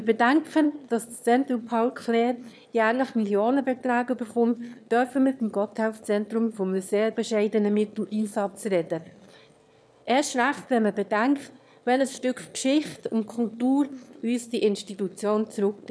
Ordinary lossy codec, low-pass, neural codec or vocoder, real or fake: none; none; autoencoder, 22.05 kHz, a latent of 192 numbers a frame, VITS, trained on one speaker; fake